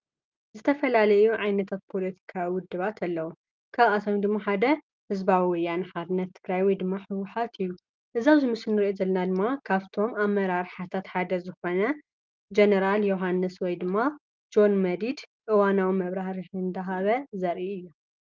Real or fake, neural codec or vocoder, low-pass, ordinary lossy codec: real; none; 7.2 kHz; Opus, 32 kbps